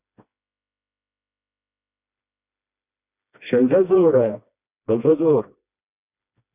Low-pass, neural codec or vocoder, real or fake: 3.6 kHz; codec, 16 kHz, 1 kbps, FreqCodec, smaller model; fake